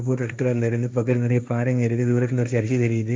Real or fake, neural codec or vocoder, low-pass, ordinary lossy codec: fake; codec, 16 kHz, 1.1 kbps, Voila-Tokenizer; none; none